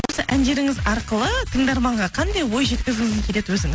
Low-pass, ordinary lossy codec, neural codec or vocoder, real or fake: none; none; none; real